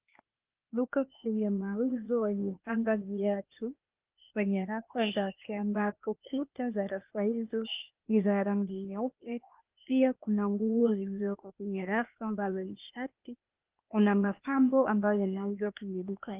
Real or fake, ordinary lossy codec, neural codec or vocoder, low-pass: fake; Opus, 32 kbps; codec, 16 kHz, 0.8 kbps, ZipCodec; 3.6 kHz